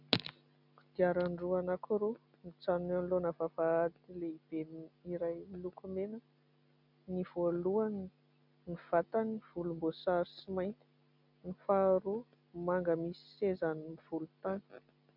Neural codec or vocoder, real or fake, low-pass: none; real; 5.4 kHz